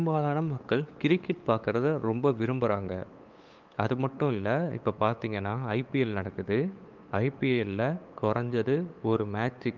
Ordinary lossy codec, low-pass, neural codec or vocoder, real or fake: Opus, 24 kbps; 7.2 kHz; codec, 16 kHz, 8 kbps, FunCodec, trained on LibriTTS, 25 frames a second; fake